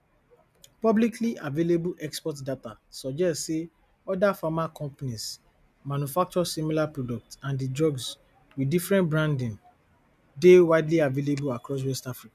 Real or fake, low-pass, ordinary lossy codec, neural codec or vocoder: real; 14.4 kHz; none; none